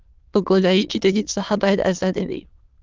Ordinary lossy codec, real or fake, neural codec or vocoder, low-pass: Opus, 24 kbps; fake; autoencoder, 22.05 kHz, a latent of 192 numbers a frame, VITS, trained on many speakers; 7.2 kHz